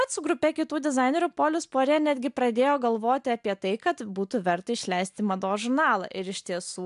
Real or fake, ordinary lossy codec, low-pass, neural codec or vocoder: real; AAC, 96 kbps; 10.8 kHz; none